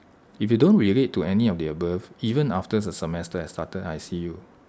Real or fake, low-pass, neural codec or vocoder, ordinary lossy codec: real; none; none; none